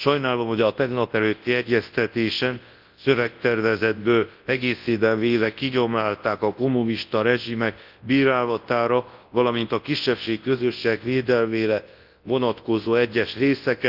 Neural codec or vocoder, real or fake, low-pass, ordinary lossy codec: codec, 24 kHz, 0.9 kbps, WavTokenizer, large speech release; fake; 5.4 kHz; Opus, 32 kbps